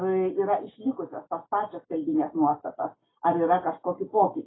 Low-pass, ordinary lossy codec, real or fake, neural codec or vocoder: 7.2 kHz; AAC, 16 kbps; real; none